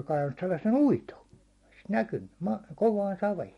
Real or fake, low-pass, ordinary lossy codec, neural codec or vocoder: real; 19.8 kHz; MP3, 48 kbps; none